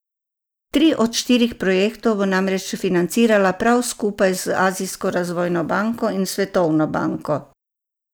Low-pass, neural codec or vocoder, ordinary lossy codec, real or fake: none; none; none; real